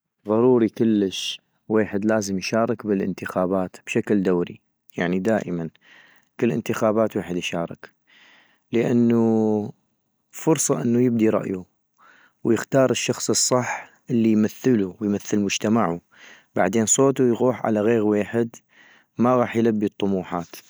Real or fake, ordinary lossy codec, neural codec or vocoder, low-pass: real; none; none; none